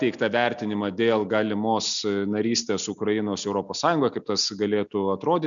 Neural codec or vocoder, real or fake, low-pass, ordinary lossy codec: none; real; 7.2 kHz; MP3, 96 kbps